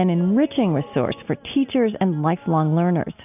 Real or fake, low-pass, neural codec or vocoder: real; 3.6 kHz; none